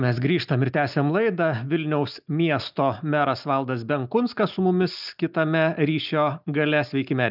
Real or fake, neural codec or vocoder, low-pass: real; none; 5.4 kHz